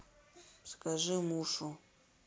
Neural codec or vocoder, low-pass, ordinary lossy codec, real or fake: none; none; none; real